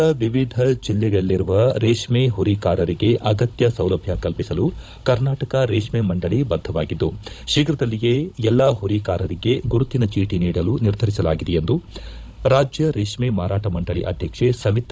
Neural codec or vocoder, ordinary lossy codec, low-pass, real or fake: codec, 16 kHz, 16 kbps, FunCodec, trained on Chinese and English, 50 frames a second; none; none; fake